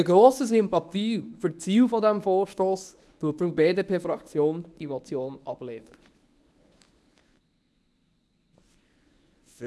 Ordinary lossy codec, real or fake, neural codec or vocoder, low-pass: none; fake; codec, 24 kHz, 0.9 kbps, WavTokenizer, medium speech release version 1; none